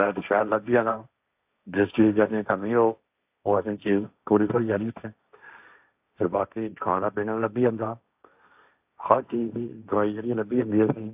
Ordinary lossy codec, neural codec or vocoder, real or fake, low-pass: AAC, 32 kbps; codec, 16 kHz, 1.1 kbps, Voila-Tokenizer; fake; 3.6 kHz